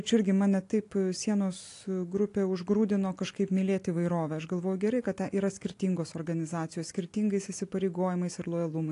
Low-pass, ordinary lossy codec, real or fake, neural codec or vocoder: 10.8 kHz; AAC, 48 kbps; real; none